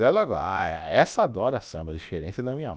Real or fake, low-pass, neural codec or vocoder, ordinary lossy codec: fake; none; codec, 16 kHz, about 1 kbps, DyCAST, with the encoder's durations; none